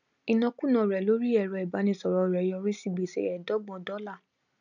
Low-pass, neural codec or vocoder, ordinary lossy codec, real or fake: 7.2 kHz; none; none; real